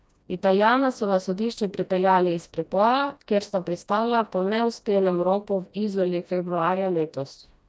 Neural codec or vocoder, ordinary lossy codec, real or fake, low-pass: codec, 16 kHz, 1 kbps, FreqCodec, smaller model; none; fake; none